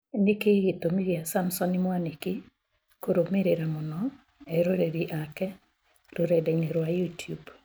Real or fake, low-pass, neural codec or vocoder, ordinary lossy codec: real; none; none; none